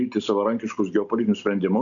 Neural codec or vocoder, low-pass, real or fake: none; 7.2 kHz; real